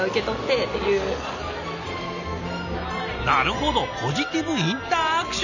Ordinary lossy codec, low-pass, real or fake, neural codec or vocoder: none; 7.2 kHz; real; none